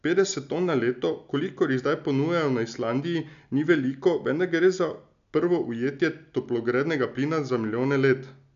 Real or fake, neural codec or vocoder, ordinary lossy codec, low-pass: real; none; none; 7.2 kHz